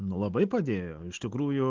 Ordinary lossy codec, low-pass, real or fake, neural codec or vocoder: Opus, 16 kbps; 7.2 kHz; real; none